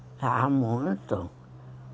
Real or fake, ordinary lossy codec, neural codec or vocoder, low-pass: real; none; none; none